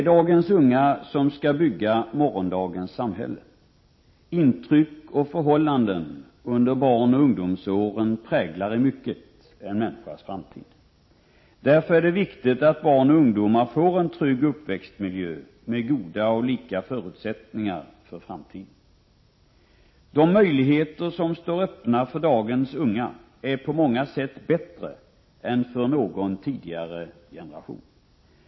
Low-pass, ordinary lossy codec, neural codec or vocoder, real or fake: 7.2 kHz; MP3, 24 kbps; none; real